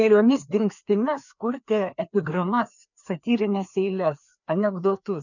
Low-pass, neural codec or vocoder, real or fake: 7.2 kHz; codec, 16 kHz, 2 kbps, FreqCodec, larger model; fake